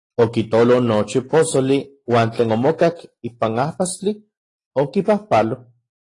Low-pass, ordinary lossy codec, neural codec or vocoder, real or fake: 10.8 kHz; AAC, 32 kbps; none; real